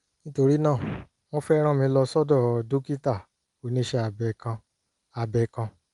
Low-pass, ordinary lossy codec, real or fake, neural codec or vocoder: 10.8 kHz; Opus, 32 kbps; real; none